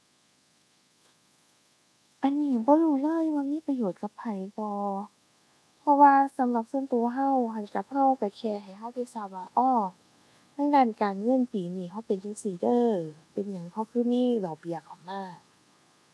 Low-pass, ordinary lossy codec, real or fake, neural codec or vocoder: none; none; fake; codec, 24 kHz, 1.2 kbps, DualCodec